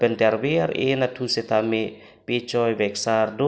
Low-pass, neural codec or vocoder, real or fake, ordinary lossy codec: none; none; real; none